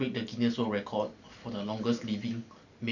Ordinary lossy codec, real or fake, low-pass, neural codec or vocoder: none; real; 7.2 kHz; none